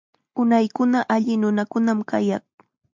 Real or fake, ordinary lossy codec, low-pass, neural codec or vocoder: real; MP3, 64 kbps; 7.2 kHz; none